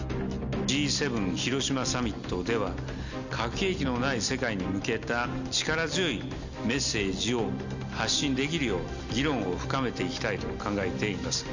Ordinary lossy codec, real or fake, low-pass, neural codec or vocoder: Opus, 64 kbps; real; 7.2 kHz; none